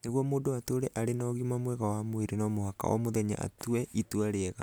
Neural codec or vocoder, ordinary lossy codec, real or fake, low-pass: none; none; real; none